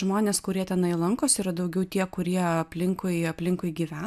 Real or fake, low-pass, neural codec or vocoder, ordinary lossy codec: real; 14.4 kHz; none; AAC, 96 kbps